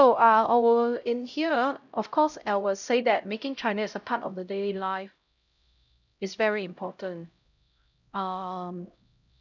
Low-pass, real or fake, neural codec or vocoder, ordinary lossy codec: 7.2 kHz; fake; codec, 16 kHz, 0.5 kbps, X-Codec, HuBERT features, trained on LibriSpeech; none